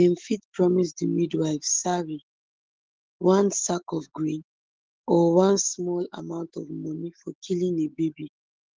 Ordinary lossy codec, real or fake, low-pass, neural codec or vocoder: Opus, 16 kbps; fake; 7.2 kHz; autoencoder, 48 kHz, 128 numbers a frame, DAC-VAE, trained on Japanese speech